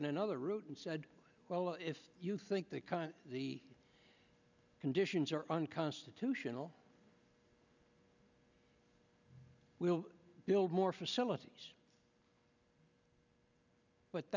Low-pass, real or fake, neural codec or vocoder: 7.2 kHz; real; none